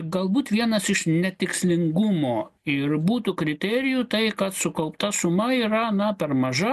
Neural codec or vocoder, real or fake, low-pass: none; real; 14.4 kHz